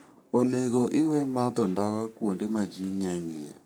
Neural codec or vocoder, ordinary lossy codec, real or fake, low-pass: codec, 44.1 kHz, 3.4 kbps, Pupu-Codec; none; fake; none